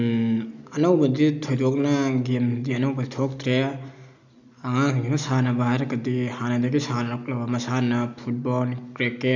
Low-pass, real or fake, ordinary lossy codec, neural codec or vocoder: 7.2 kHz; real; none; none